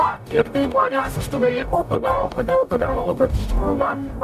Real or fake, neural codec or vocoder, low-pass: fake; codec, 44.1 kHz, 0.9 kbps, DAC; 14.4 kHz